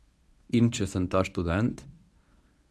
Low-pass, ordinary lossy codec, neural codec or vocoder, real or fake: none; none; codec, 24 kHz, 0.9 kbps, WavTokenizer, medium speech release version 1; fake